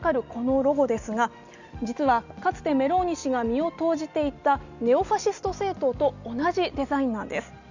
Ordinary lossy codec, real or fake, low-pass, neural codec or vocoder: none; real; 7.2 kHz; none